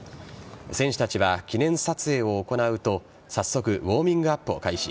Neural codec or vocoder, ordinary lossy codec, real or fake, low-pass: none; none; real; none